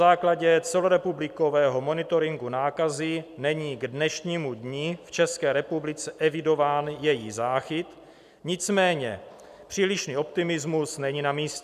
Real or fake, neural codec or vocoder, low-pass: fake; vocoder, 44.1 kHz, 128 mel bands every 256 samples, BigVGAN v2; 14.4 kHz